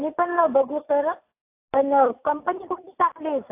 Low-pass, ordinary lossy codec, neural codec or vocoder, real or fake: 3.6 kHz; AAC, 24 kbps; none; real